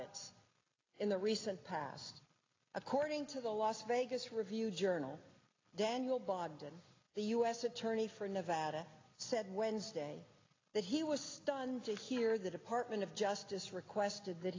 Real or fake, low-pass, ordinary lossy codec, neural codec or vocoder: real; 7.2 kHz; AAC, 32 kbps; none